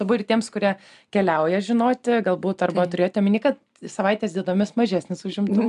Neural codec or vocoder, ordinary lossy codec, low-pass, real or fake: none; AAC, 96 kbps; 10.8 kHz; real